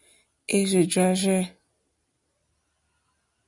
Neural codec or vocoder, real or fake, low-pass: none; real; 10.8 kHz